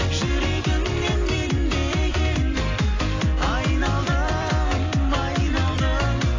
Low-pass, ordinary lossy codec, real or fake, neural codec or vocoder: 7.2 kHz; AAC, 32 kbps; fake; vocoder, 44.1 kHz, 128 mel bands every 256 samples, BigVGAN v2